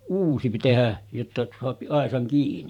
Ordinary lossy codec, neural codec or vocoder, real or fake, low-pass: none; vocoder, 44.1 kHz, 128 mel bands every 512 samples, BigVGAN v2; fake; 19.8 kHz